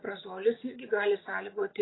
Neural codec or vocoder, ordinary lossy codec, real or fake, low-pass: none; AAC, 16 kbps; real; 7.2 kHz